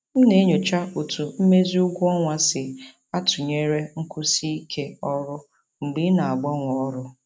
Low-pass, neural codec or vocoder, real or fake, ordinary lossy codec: none; none; real; none